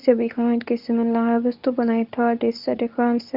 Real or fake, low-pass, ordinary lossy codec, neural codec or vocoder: fake; 5.4 kHz; Opus, 64 kbps; codec, 24 kHz, 0.9 kbps, WavTokenizer, medium speech release version 2